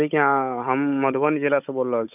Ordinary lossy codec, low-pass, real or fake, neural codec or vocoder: none; 3.6 kHz; fake; codec, 16 kHz, 16 kbps, FunCodec, trained on Chinese and English, 50 frames a second